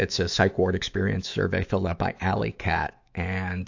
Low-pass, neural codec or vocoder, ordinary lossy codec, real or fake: 7.2 kHz; autoencoder, 48 kHz, 128 numbers a frame, DAC-VAE, trained on Japanese speech; AAC, 48 kbps; fake